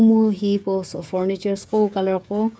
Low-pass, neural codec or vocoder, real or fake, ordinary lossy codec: none; codec, 16 kHz, 16 kbps, FreqCodec, smaller model; fake; none